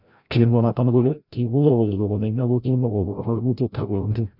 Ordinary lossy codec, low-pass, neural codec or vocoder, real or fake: none; 5.4 kHz; codec, 16 kHz, 0.5 kbps, FreqCodec, larger model; fake